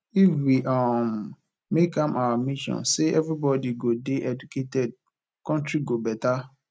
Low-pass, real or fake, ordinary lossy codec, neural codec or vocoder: none; real; none; none